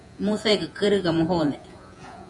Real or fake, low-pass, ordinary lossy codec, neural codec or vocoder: fake; 10.8 kHz; MP3, 48 kbps; vocoder, 48 kHz, 128 mel bands, Vocos